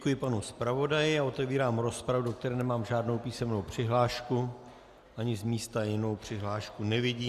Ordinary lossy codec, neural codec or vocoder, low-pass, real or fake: Opus, 64 kbps; none; 14.4 kHz; real